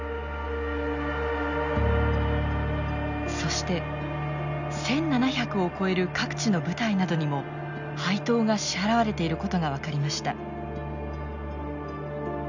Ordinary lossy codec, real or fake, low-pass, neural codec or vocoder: none; real; 7.2 kHz; none